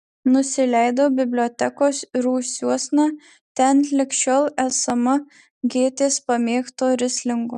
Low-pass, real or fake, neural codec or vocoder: 10.8 kHz; real; none